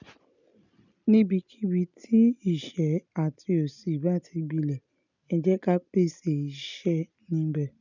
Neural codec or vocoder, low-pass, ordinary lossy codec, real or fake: none; 7.2 kHz; none; real